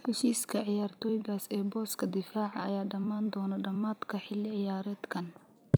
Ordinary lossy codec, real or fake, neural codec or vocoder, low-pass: none; fake; vocoder, 44.1 kHz, 128 mel bands every 256 samples, BigVGAN v2; none